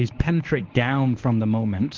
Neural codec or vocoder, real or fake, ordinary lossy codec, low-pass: codec, 24 kHz, 0.9 kbps, WavTokenizer, medium speech release version 1; fake; Opus, 32 kbps; 7.2 kHz